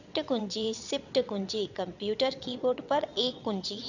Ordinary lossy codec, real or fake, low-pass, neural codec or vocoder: none; fake; 7.2 kHz; vocoder, 22.05 kHz, 80 mel bands, WaveNeXt